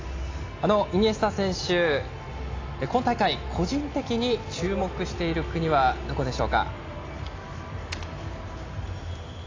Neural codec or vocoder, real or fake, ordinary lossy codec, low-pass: none; real; AAC, 32 kbps; 7.2 kHz